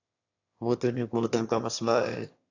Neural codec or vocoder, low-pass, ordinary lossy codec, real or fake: autoencoder, 22.05 kHz, a latent of 192 numbers a frame, VITS, trained on one speaker; 7.2 kHz; AAC, 48 kbps; fake